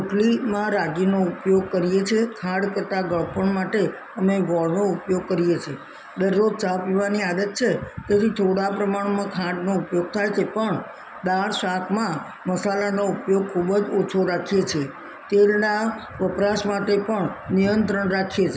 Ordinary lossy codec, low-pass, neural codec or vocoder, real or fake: none; none; none; real